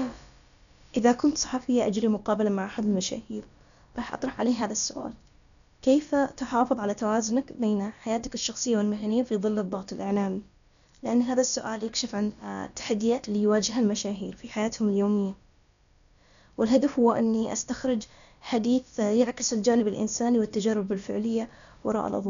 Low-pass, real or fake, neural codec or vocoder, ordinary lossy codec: 7.2 kHz; fake; codec, 16 kHz, about 1 kbps, DyCAST, with the encoder's durations; none